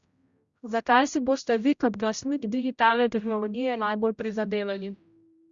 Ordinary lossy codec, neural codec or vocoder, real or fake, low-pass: Opus, 64 kbps; codec, 16 kHz, 0.5 kbps, X-Codec, HuBERT features, trained on general audio; fake; 7.2 kHz